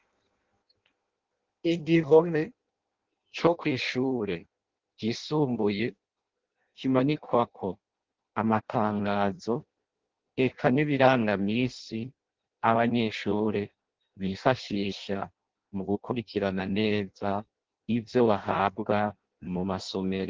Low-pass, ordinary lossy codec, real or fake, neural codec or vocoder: 7.2 kHz; Opus, 16 kbps; fake; codec, 16 kHz in and 24 kHz out, 0.6 kbps, FireRedTTS-2 codec